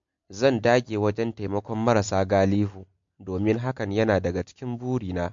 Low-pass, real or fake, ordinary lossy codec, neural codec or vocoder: 7.2 kHz; real; MP3, 48 kbps; none